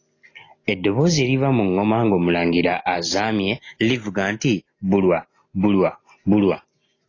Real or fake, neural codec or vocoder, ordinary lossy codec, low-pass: real; none; AAC, 32 kbps; 7.2 kHz